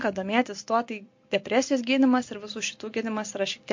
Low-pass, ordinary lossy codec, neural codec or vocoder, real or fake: 7.2 kHz; MP3, 48 kbps; none; real